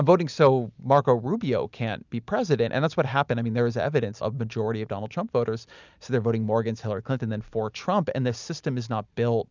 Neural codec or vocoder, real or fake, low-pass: none; real; 7.2 kHz